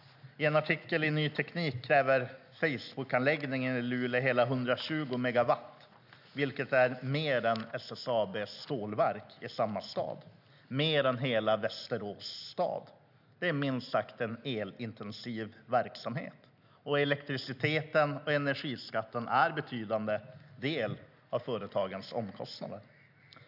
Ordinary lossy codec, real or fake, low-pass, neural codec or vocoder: none; real; 5.4 kHz; none